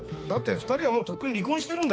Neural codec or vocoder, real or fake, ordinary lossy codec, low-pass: codec, 16 kHz, 2 kbps, X-Codec, HuBERT features, trained on balanced general audio; fake; none; none